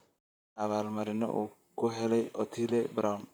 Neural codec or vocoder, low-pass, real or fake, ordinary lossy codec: vocoder, 44.1 kHz, 128 mel bands, Pupu-Vocoder; none; fake; none